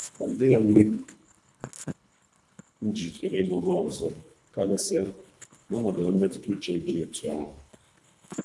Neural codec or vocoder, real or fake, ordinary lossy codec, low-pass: codec, 24 kHz, 1.5 kbps, HILCodec; fake; none; none